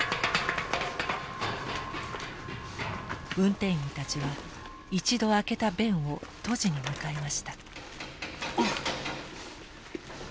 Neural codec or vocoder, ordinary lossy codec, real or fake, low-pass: none; none; real; none